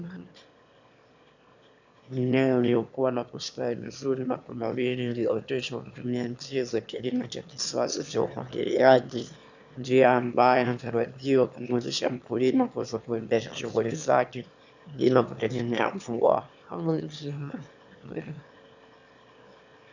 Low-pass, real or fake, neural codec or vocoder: 7.2 kHz; fake; autoencoder, 22.05 kHz, a latent of 192 numbers a frame, VITS, trained on one speaker